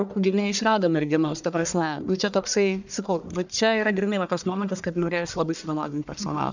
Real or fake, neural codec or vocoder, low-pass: fake; codec, 44.1 kHz, 1.7 kbps, Pupu-Codec; 7.2 kHz